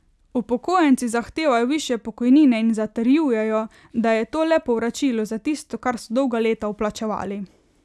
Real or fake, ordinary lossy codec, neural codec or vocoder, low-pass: real; none; none; none